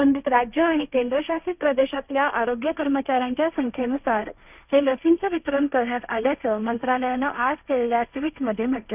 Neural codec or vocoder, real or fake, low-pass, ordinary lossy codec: codec, 16 kHz, 1.1 kbps, Voila-Tokenizer; fake; 3.6 kHz; none